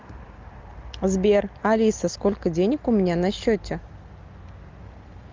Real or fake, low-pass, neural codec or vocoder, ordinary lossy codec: real; 7.2 kHz; none; Opus, 24 kbps